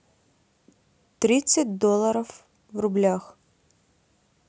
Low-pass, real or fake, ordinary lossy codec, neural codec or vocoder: none; real; none; none